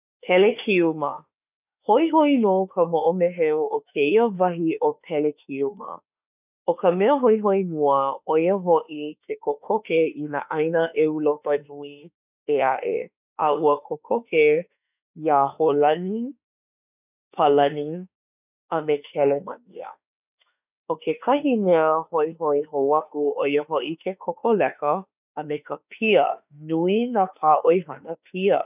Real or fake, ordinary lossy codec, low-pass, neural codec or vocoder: fake; none; 3.6 kHz; autoencoder, 48 kHz, 32 numbers a frame, DAC-VAE, trained on Japanese speech